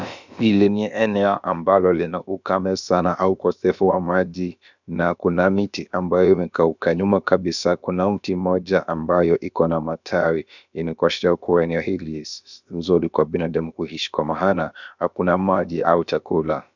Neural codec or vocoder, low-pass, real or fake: codec, 16 kHz, about 1 kbps, DyCAST, with the encoder's durations; 7.2 kHz; fake